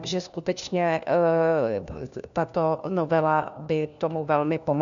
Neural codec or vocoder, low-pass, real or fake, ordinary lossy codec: codec, 16 kHz, 1 kbps, FunCodec, trained on LibriTTS, 50 frames a second; 7.2 kHz; fake; MP3, 96 kbps